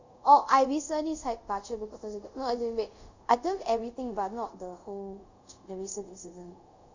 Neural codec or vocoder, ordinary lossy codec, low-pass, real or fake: codec, 24 kHz, 0.5 kbps, DualCodec; Opus, 64 kbps; 7.2 kHz; fake